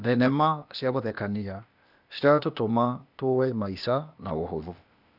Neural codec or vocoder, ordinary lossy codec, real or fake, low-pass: codec, 16 kHz, 0.8 kbps, ZipCodec; none; fake; 5.4 kHz